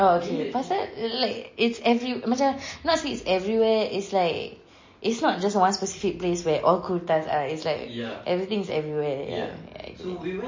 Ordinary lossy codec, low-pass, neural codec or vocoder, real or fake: MP3, 32 kbps; 7.2 kHz; none; real